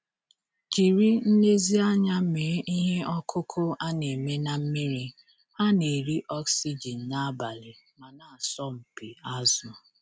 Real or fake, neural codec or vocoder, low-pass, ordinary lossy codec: real; none; none; none